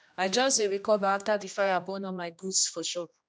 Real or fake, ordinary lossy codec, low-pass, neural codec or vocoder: fake; none; none; codec, 16 kHz, 1 kbps, X-Codec, HuBERT features, trained on general audio